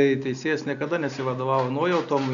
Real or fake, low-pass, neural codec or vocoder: real; 7.2 kHz; none